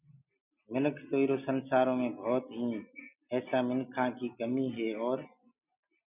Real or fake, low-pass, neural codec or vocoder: real; 3.6 kHz; none